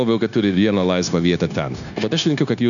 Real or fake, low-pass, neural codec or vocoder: fake; 7.2 kHz; codec, 16 kHz, 0.9 kbps, LongCat-Audio-Codec